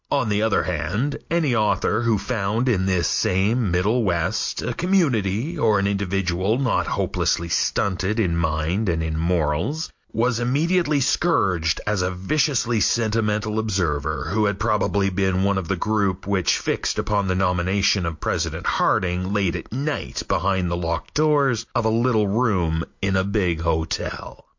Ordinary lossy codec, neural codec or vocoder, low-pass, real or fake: MP3, 48 kbps; none; 7.2 kHz; real